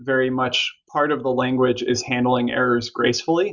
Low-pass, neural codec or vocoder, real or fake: 7.2 kHz; none; real